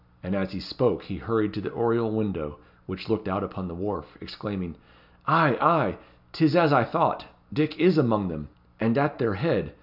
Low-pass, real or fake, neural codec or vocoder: 5.4 kHz; real; none